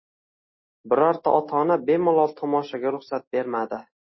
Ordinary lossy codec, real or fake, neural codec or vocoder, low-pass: MP3, 24 kbps; real; none; 7.2 kHz